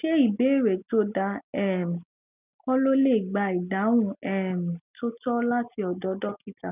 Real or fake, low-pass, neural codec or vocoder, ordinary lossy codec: real; 3.6 kHz; none; none